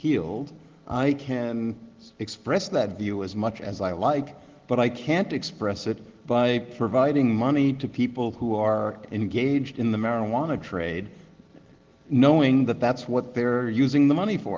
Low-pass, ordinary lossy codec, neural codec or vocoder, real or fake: 7.2 kHz; Opus, 16 kbps; none; real